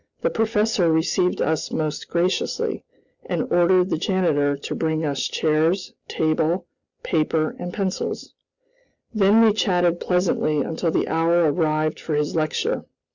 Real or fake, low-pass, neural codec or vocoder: real; 7.2 kHz; none